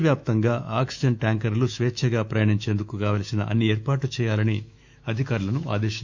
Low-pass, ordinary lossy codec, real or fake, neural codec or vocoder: 7.2 kHz; Opus, 64 kbps; fake; autoencoder, 48 kHz, 128 numbers a frame, DAC-VAE, trained on Japanese speech